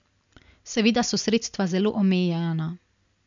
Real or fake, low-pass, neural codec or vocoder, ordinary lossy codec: real; 7.2 kHz; none; none